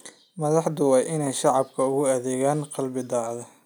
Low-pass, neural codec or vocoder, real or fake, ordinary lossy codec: none; none; real; none